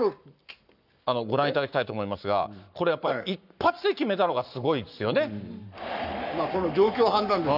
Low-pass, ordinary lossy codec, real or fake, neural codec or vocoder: 5.4 kHz; none; fake; codec, 44.1 kHz, 7.8 kbps, Pupu-Codec